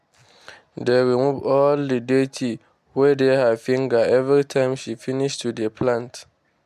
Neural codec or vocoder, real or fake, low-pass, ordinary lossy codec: none; real; 14.4 kHz; MP3, 96 kbps